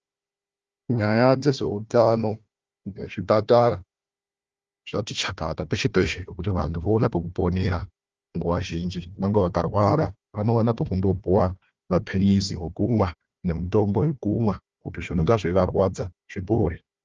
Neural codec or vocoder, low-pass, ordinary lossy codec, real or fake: codec, 16 kHz, 1 kbps, FunCodec, trained on Chinese and English, 50 frames a second; 7.2 kHz; Opus, 24 kbps; fake